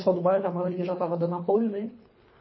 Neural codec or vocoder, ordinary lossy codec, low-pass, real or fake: codec, 24 kHz, 3 kbps, HILCodec; MP3, 24 kbps; 7.2 kHz; fake